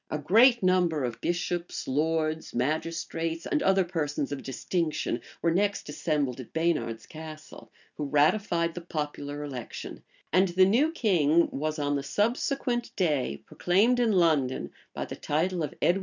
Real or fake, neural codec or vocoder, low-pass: real; none; 7.2 kHz